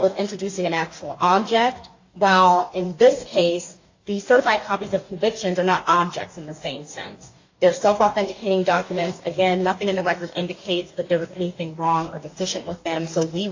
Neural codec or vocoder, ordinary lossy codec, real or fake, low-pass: codec, 44.1 kHz, 2.6 kbps, DAC; AAC, 48 kbps; fake; 7.2 kHz